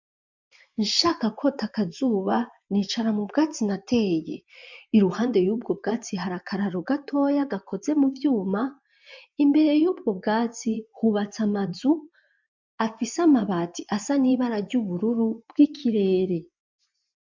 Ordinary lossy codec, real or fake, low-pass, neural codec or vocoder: MP3, 64 kbps; fake; 7.2 kHz; vocoder, 24 kHz, 100 mel bands, Vocos